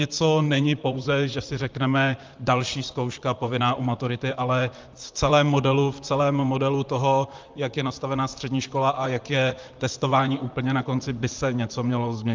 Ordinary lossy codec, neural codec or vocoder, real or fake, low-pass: Opus, 24 kbps; vocoder, 44.1 kHz, 128 mel bands, Pupu-Vocoder; fake; 7.2 kHz